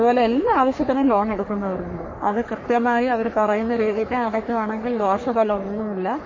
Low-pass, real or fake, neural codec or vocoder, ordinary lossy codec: 7.2 kHz; fake; codec, 44.1 kHz, 3.4 kbps, Pupu-Codec; MP3, 32 kbps